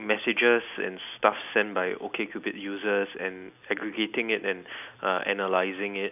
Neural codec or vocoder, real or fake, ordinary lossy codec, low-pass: none; real; none; 3.6 kHz